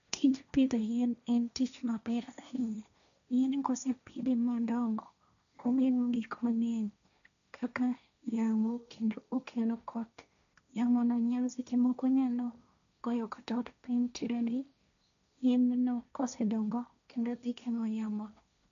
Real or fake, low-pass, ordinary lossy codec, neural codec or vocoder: fake; 7.2 kHz; none; codec, 16 kHz, 1.1 kbps, Voila-Tokenizer